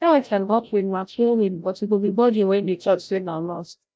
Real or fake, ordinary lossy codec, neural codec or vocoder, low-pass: fake; none; codec, 16 kHz, 0.5 kbps, FreqCodec, larger model; none